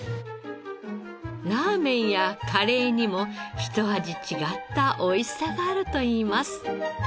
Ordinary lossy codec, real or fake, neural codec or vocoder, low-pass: none; real; none; none